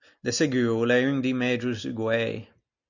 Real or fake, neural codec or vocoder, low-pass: real; none; 7.2 kHz